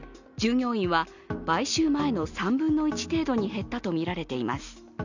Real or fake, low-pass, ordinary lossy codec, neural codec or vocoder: real; 7.2 kHz; none; none